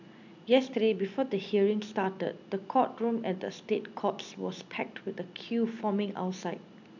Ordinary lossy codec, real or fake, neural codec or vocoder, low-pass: none; real; none; 7.2 kHz